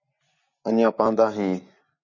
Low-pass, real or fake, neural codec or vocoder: 7.2 kHz; real; none